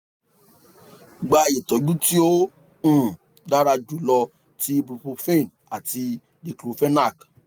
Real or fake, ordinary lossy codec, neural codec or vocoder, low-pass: real; none; none; none